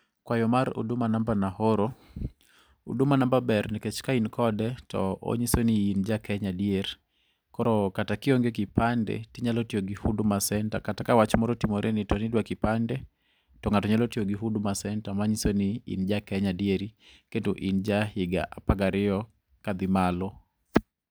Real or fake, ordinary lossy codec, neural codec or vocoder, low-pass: real; none; none; none